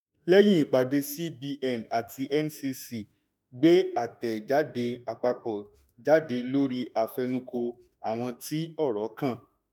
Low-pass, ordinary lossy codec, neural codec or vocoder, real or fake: none; none; autoencoder, 48 kHz, 32 numbers a frame, DAC-VAE, trained on Japanese speech; fake